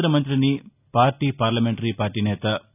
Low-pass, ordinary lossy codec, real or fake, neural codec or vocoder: 3.6 kHz; none; real; none